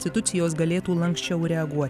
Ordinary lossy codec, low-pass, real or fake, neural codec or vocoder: AAC, 96 kbps; 14.4 kHz; real; none